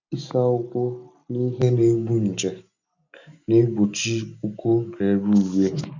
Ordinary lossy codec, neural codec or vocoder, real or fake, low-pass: MP3, 64 kbps; none; real; 7.2 kHz